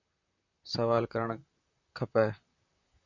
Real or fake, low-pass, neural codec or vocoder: fake; 7.2 kHz; vocoder, 44.1 kHz, 128 mel bands, Pupu-Vocoder